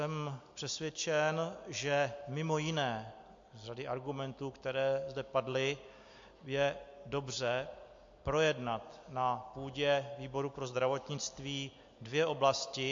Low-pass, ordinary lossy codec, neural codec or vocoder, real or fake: 7.2 kHz; MP3, 48 kbps; none; real